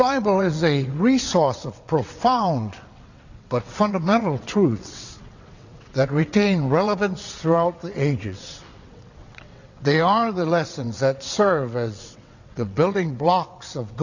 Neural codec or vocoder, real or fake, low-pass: vocoder, 22.05 kHz, 80 mel bands, WaveNeXt; fake; 7.2 kHz